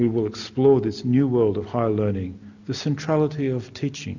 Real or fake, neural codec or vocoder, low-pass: real; none; 7.2 kHz